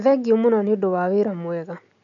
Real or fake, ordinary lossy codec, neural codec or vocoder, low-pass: real; none; none; 7.2 kHz